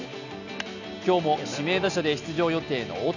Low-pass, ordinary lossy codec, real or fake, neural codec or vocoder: 7.2 kHz; none; real; none